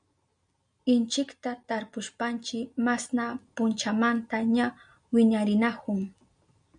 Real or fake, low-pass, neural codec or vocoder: real; 9.9 kHz; none